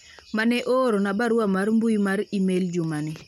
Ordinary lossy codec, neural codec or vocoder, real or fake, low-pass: MP3, 96 kbps; none; real; 19.8 kHz